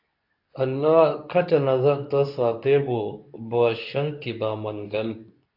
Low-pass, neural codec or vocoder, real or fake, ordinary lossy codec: 5.4 kHz; codec, 24 kHz, 0.9 kbps, WavTokenizer, medium speech release version 2; fake; AAC, 48 kbps